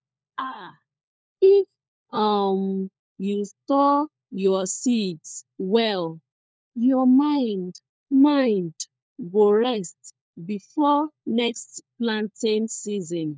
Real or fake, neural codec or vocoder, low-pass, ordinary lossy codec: fake; codec, 16 kHz, 4 kbps, FunCodec, trained on LibriTTS, 50 frames a second; none; none